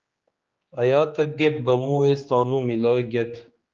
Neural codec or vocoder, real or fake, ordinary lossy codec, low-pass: codec, 16 kHz, 2 kbps, X-Codec, HuBERT features, trained on general audio; fake; Opus, 24 kbps; 7.2 kHz